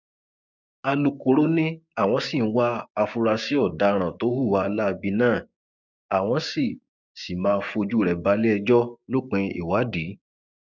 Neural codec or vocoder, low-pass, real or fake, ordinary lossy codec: codec, 16 kHz, 6 kbps, DAC; 7.2 kHz; fake; none